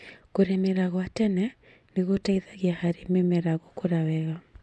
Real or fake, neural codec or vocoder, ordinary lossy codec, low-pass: fake; vocoder, 24 kHz, 100 mel bands, Vocos; none; none